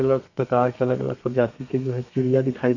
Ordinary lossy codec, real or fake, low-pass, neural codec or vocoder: none; fake; 7.2 kHz; codec, 32 kHz, 1.9 kbps, SNAC